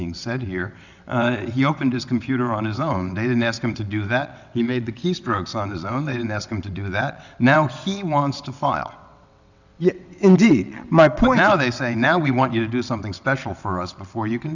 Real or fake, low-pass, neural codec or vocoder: fake; 7.2 kHz; vocoder, 22.05 kHz, 80 mel bands, WaveNeXt